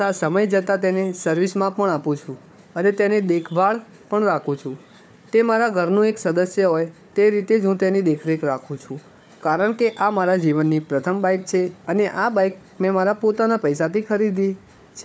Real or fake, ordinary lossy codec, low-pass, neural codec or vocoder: fake; none; none; codec, 16 kHz, 4 kbps, FunCodec, trained on Chinese and English, 50 frames a second